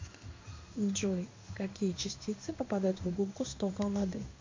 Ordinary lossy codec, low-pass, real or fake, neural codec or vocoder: MP3, 64 kbps; 7.2 kHz; fake; codec, 16 kHz in and 24 kHz out, 1 kbps, XY-Tokenizer